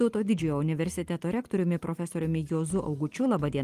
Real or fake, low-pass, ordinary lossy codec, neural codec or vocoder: real; 14.4 kHz; Opus, 24 kbps; none